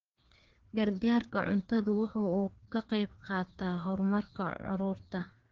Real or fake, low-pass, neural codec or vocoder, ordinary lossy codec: fake; 7.2 kHz; codec, 16 kHz, 2 kbps, FreqCodec, larger model; Opus, 16 kbps